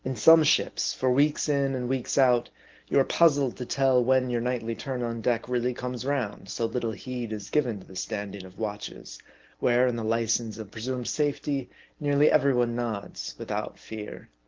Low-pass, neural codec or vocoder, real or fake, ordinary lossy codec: 7.2 kHz; none; real; Opus, 16 kbps